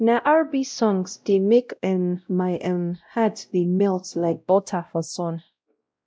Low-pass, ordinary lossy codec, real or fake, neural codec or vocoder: none; none; fake; codec, 16 kHz, 0.5 kbps, X-Codec, WavLM features, trained on Multilingual LibriSpeech